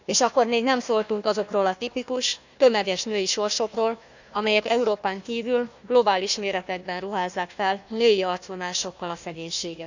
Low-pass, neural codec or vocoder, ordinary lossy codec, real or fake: 7.2 kHz; codec, 16 kHz, 1 kbps, FunCodec, trained on Chinese and English, 50 frames a second; none; fake